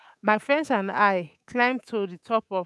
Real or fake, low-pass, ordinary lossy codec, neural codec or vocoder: fake; none; none; codec, 24 kHz, 3.1 kbps, DualCodec